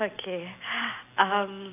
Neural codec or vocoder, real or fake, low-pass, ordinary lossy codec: none; real; 3.6 kHz; AAC, 24 kbps